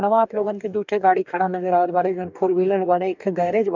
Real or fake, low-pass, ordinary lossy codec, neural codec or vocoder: fake; 7.2 kHz; none; codec, 44.1 kHz, 2.6 kbps, DAC